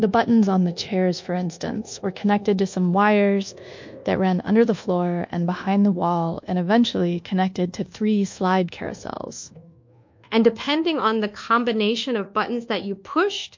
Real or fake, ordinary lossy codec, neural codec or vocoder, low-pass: fake; MP3, 48 kbps; codec, 24 kHz, 1.2 kbps, DualCodec; 7.2 kHz